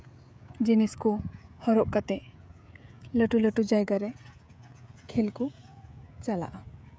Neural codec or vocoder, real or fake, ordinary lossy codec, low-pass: codec, 16 kHz, 16 kbps, FreqCodec, smaller model; fake; none; none